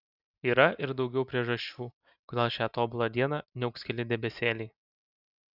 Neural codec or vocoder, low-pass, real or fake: none; 5.4 kHz; real